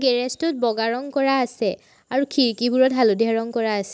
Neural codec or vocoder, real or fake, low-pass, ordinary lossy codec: none; real; none; none